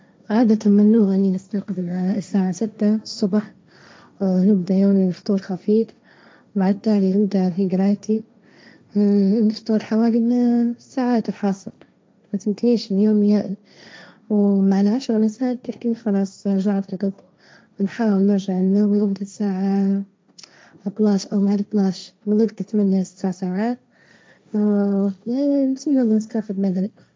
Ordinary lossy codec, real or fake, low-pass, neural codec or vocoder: none; fake; none; codec, 16 kHz, 1.1 kbps, Voila-Tokenizer